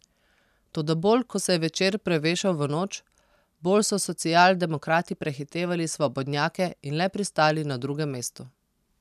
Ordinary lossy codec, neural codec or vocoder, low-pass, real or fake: none; none; 14.4 kHz; real